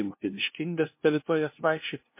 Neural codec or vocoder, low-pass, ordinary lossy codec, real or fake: codec, 16 kHz, 0.5 kbps, FunCodec, trained on LibriTTS, 25 frames a second; 3.6 kHz; MP3, 24 kbps; fake